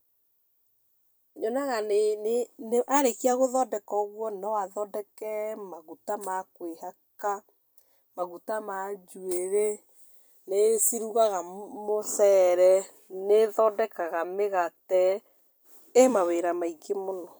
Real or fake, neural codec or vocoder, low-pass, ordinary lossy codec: fake; vocoder, 44.1 kHz, 128 mel bands every 256 samples, BigVGAN v2; none; none